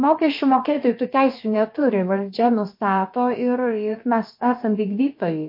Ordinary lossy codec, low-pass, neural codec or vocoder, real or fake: MP3, 32 kbps; 5.4 kHz; codec, 16 kHz, about 1 kbps, DyCAST, with the encoder's durations; fake